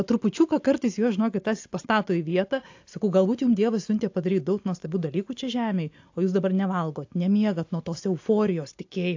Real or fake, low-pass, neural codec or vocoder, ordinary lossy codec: real; 7.2 kHz; none; AAC, 48 kbps